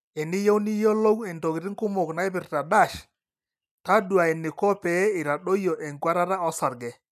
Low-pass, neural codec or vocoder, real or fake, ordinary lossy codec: 14.4 kHz; none; real; none